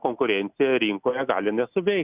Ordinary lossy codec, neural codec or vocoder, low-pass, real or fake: Opus, 64 kbps; none; 3.6 kHz; real